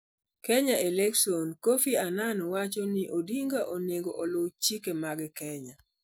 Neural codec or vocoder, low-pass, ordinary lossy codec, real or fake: none; none; none; real